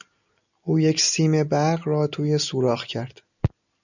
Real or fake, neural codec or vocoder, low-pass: real; none; 7.2 kHz